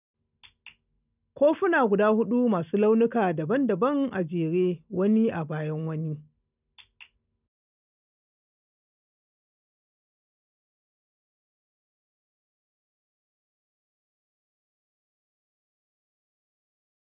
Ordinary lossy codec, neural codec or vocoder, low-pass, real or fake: none; none; 3.6 kHz; real